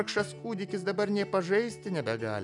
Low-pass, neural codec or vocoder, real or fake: 10.8 kHz; none; real